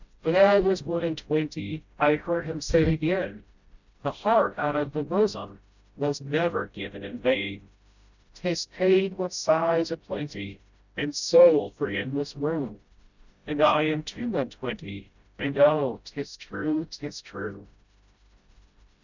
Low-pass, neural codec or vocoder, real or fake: 7.2 kHz; codec, 16 kHz, 0.5 kbps, FreqCodec, smaller model; fake